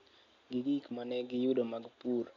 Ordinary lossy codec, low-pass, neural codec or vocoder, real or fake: none; 7.2 kHz; none; real